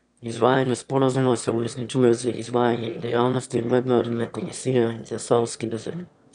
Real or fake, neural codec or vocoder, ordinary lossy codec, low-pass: fake; autoencoder, 22.05 kHz, a latent of 192 numbers a frame, VITS, trained on one speaker; none; 9.9 kHz